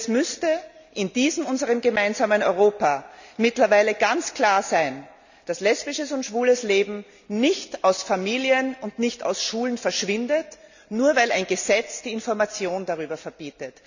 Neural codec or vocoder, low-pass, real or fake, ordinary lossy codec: none; 7.2 kHz; real; none